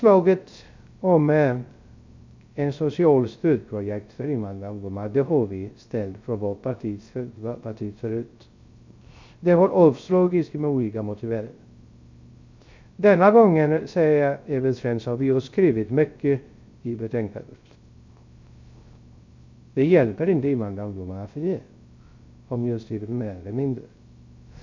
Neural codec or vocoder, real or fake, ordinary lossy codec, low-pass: codec, 16 kHz, 0.3 kbps, FocalCodec; fake; MP3, 64 kbps; 7.2 kHz